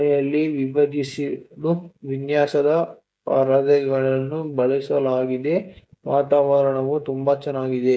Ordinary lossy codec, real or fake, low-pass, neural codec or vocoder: none; fake; none; codec, 16 kHz, 16 kbps, FreqCodec, smaller model